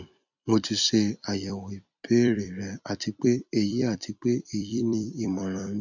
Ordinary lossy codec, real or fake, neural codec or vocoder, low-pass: none; fake; vocoder, 44.1 kHz, 128 mel bands every 256 samples, BigVGAN v2; 7.2 kHz